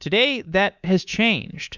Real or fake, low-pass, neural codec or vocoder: fake; 7.2 kHz; codec, 24 kHz, 3.1 kbps, DualCodec